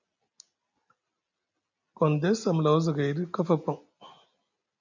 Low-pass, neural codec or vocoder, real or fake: 7.2 kHz; none; real